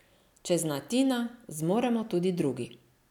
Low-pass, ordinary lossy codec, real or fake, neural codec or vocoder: 19.8 kHz; none; real; none